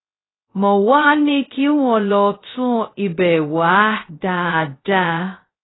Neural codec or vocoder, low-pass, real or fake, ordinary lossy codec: codec, 16 kHz, 0.2 kbps, FocalCodec; 7.2 kHz; fake; AAC, 16 kbps